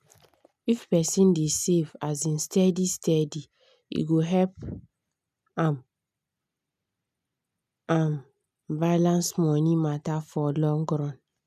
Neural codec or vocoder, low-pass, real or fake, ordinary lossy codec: none; 14.4 kHz; real; none